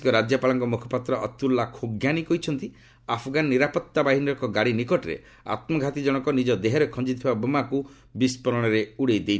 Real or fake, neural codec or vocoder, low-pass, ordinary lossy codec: real; none; none; none